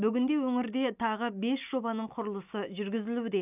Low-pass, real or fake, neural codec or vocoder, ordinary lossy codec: 3.6 kHz; real; none; none